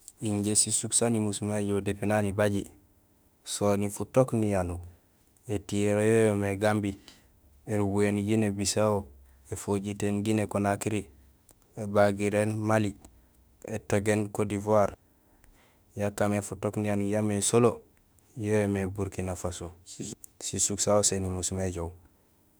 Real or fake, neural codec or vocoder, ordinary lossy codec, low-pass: fake; autoencoder, 48 kHz, 32 numbers a frame, DAC-VAE, trained on Japanese speech; none; none